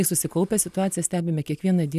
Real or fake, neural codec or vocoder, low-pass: real; none; 14.4 kHz